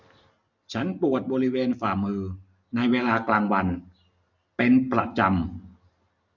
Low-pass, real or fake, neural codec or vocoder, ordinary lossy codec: 7.2 kHz; real; none; none